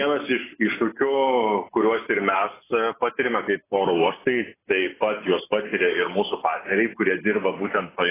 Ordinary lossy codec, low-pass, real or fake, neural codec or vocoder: AAC, 16 kbps; 3.6 kHz; real; none